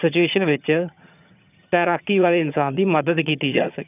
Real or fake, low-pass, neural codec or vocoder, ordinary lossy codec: fake; 3.6 kHz; vocoder, 22.05 kHz, 80 mel bands, HiFi-GAN; none